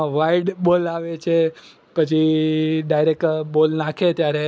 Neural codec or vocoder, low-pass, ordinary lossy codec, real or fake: none; none; none; real